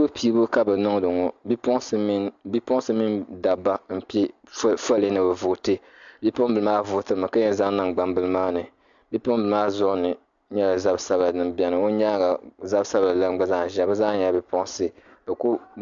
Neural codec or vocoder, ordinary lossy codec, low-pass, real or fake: none; MP3, 64 kbps; 7.2 kHz; real